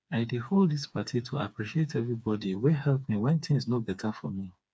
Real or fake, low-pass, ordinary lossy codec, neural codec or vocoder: fake; none; none; codec, 16 kHz, 4 kbps, FreqCodec, smaller model